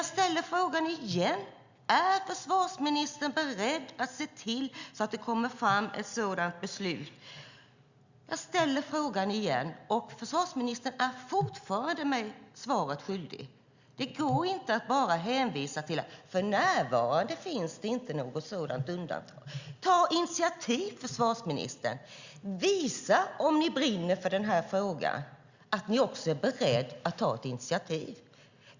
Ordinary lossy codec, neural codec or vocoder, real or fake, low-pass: Opus, 64 kbps; none; real; 7.2 kHz